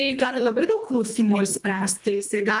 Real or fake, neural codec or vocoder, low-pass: fake; codec, 24 kHz, 1.5 kbps, HILCodec; 10.8 kHz